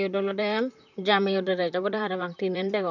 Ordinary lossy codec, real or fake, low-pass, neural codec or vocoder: none; fake; 7.2 kHz; vocoder, 44.1 kHz, 128 mel bands, Pupu-Vocoder